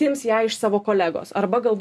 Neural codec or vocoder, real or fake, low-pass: none; real; 14.4 kHz